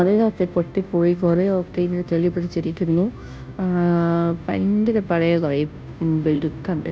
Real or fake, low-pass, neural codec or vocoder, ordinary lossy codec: fake; none; codec, 16 kHz, 0.5 kbps, FunCodec, trained on Chinese and English, 25 frames a second; none